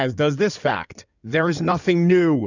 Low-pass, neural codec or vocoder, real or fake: 7.2 kHz; codec, 16 kHz in and 24 kHz out, 2.2 kbps, FireRedTTS-2 codec; fake